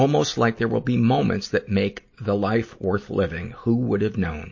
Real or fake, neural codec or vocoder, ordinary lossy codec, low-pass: real; none; MP3, 32 kbps; 7.2 kHz